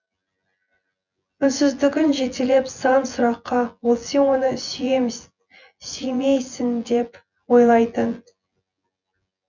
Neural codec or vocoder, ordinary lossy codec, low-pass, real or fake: vocoder, 24 kHz, 100 mel bands, Vocos; none; 7.2 kHz; fake